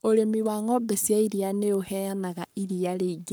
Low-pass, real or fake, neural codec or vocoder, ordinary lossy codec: none; fake; codec, 44.1 kHz, 7.8 kbps, Pupu-Codec; none